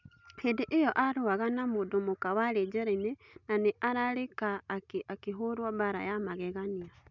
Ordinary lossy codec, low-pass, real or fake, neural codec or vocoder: none; 7.2 kHz; real; none